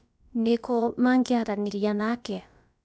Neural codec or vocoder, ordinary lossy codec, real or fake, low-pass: codec, 16 kHz, about 1 kbps, DyCAST, with the encoder's durations; none; fake; none